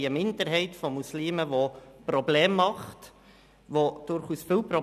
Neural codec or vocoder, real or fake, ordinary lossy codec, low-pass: none; real; none; 14.4 kHz